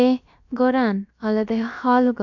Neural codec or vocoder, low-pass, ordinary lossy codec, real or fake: codec, 16 kHz, about 1 kbps, DyCAST, with the encoder's durations; 7.2 kHz; none; fake